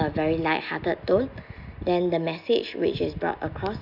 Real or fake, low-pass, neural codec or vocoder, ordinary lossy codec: real; 5.4 kHz; none; none